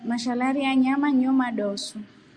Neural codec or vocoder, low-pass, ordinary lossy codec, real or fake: none; 9.9 kHz; Opus, 64 kbps; real